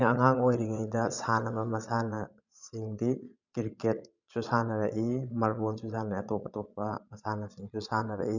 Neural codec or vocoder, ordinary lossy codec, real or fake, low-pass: vocoder, 22.05 kHz, 80 mel bands, Vocos; none; fake; 7.2 kHz